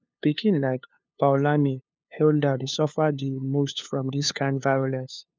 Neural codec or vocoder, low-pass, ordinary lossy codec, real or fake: codec, 16 kHz, 2 kbps, FunCodec, trained on LibriTTS, 25 frames a second; none; none; fake